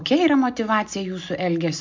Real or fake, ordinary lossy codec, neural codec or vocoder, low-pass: real; MP3, 64 kbps; none; 7.2 kHz